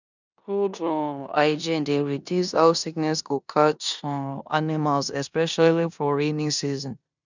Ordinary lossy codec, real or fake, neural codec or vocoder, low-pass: none; fake; codec, 16 kHz in and 24 kHz out, 0.9 kbps, LongCat-Audio-Codec, four codebook decoder; 7.2 kHz